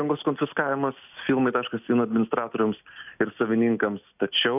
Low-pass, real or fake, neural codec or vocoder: 3.6 kHz; real; none